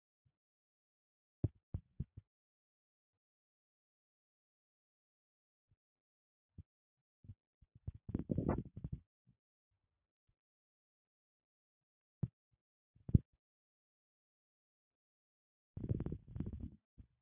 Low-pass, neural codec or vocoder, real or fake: 3.6 kHz; none; real